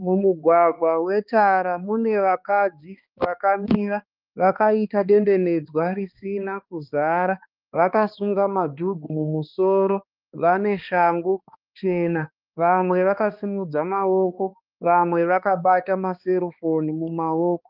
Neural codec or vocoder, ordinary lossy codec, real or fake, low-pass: codec, 16 kHz, 2 kbps, X-Codec, HuBERT features, trained on balanced general audio; Opus, 24 kbps; fake; 5.4 kHz